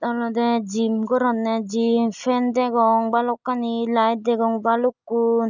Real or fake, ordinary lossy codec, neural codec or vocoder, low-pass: real; none; none; 7.2 kHz